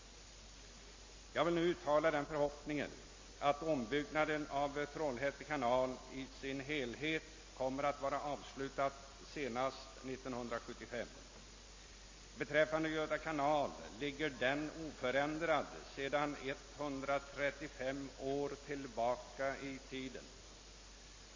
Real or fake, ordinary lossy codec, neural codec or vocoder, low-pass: real; MP3, 32 kbps; none; 7.2 kHz